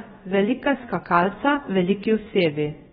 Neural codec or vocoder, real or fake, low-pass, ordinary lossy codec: codec, 16 kHz, about 1 kbps, DyCAST, with the encoder's durations; fake; 7.2 kHz; AAC, 16 kbps